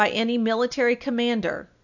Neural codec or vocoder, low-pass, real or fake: none; 7.2 kHz; real